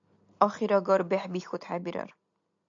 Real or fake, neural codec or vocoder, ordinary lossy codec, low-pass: real; none; AAC, 64 kbps; 7.2 kHz